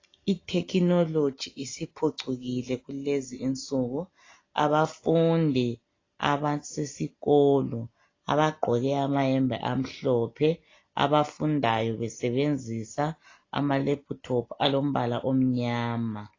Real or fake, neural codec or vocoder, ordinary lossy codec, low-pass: real; none; AAC, 32 kbps; 7.2 kHz